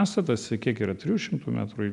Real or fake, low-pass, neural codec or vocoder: real; 10.8 kHz; none